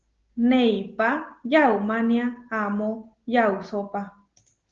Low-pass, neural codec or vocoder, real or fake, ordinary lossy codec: 7.2 kHz; none; real; Opus, 16 kbps